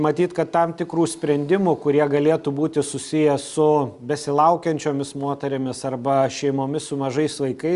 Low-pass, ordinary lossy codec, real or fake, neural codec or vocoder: 10.8 kHz; Opus, 64 kbps; real; none